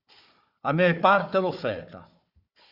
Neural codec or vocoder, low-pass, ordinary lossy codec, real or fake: codec, 16 kHz, 4 kbps, FunCodec, trained on Chinese and English, 50 frames a second; 5.4 kHz; Opus, 64 kbps; fake